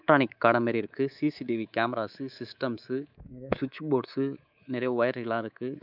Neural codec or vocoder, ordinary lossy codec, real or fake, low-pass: codec, 24 kHz, 3.1 kbps, DualCodec; none; fake; 5.4 kHz